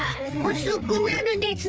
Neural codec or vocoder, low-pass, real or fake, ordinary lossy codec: codec, 16 kHz, 4 kbps, FreqCodec, larger model; none; fake; none